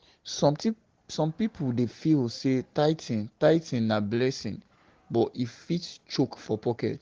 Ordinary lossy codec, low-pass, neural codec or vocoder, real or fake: Opus, 16 kbps; 7.2 kHz; none; real